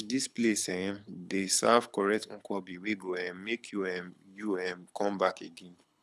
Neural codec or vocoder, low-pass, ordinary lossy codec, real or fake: codec, 24 kHz, 6 kbps, HILCodec; none; none; fake